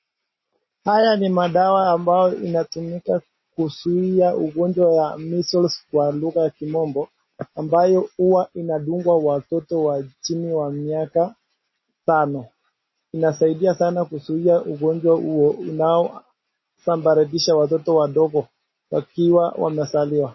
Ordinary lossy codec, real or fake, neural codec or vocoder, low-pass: MP3, 24 kbps; real; none; 7.2 kHz